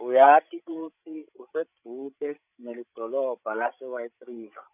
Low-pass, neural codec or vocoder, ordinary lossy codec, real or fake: 3.6 kHz; codec, 16 kHz, 16 kbps, FreqCodec, larger model; none; fake